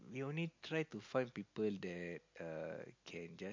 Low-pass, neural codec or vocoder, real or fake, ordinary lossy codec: 7.2 kHz; none; real; MP3, 48 kbps